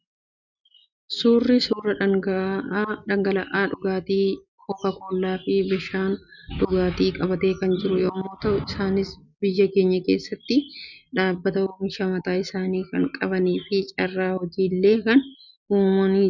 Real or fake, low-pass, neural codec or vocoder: real; 7.2 kHz; none